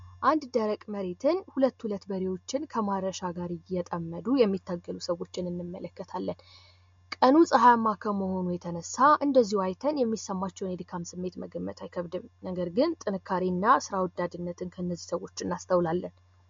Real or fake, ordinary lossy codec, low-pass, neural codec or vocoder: real; MP3, 48 kbps; 7.2 kHz; none